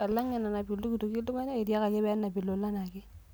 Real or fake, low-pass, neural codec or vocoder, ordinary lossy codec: real; none; none; none